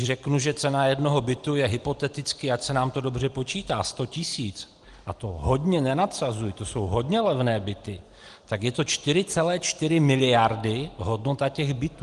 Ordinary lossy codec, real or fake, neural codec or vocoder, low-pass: Opus, 24 kbps; real; none; 10.8 kHz